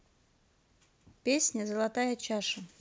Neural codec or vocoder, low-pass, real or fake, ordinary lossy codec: none; none; real; none